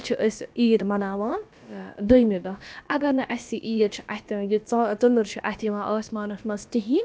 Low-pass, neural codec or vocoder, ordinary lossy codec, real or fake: none; codec, 16 kHz, about 1 kbps, DyCAST, with the encoder's durations; none; fake